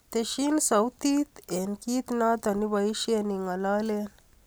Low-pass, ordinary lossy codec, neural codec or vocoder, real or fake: none; none; none; real